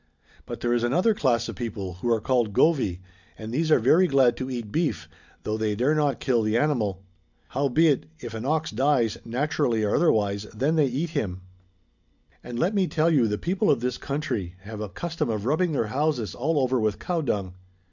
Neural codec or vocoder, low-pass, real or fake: none; 7.2 kHz; real